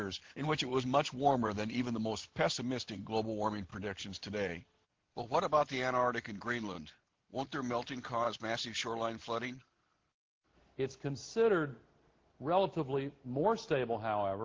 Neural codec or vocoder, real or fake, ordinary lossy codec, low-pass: none; real; Opus, 16 kbps; 7.2 kHz